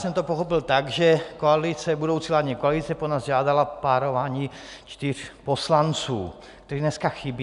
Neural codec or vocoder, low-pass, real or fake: none; 10.8 kHz; real